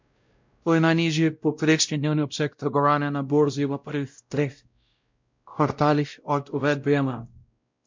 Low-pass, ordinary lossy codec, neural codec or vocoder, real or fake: 7.2 kHz; MP3, 64 kbps; codec, 16 kHz, 0.5 kbps, X-Codec, WavLM features, trained on Multilingual LibriSpeech; fake